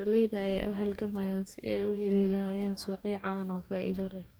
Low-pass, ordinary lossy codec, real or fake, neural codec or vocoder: none; none; fake; codec, 44.1 kHz, 2.6 kbps, DAC